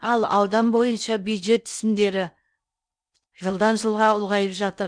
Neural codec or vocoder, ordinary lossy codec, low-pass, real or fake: codec, 16 kHz in and 24 kHz out, 0.6 kbps, FocalCodec, streaming, 4096 codes; none; 9.9 kHz; fake